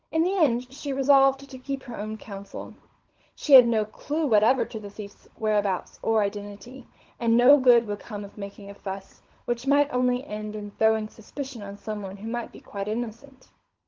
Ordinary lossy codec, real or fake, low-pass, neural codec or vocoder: Opus, 16 kbps; fake; 7.2 kHz; codec, 16 kHz, 16 kbps, FunCodec, trained on LibriTTS, 50 frames a second